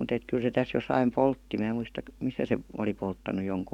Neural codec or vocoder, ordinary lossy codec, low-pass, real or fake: codec, 44.1 kHz, 7.8 kbps, DAC; none; 19.8 kHz; fake